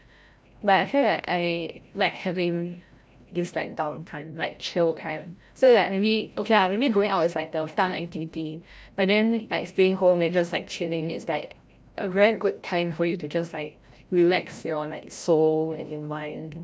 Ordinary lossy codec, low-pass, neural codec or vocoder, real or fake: none; none; codec, 16 kHz, 0.5 kbps, FreqCodec, larger model; fake